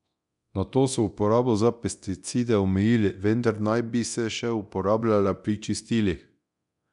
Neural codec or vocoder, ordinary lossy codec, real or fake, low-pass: codec, 24 kHz, 0.9 kbps, DualCodec; MP3, 96 kbps; fake; 10.8 kHz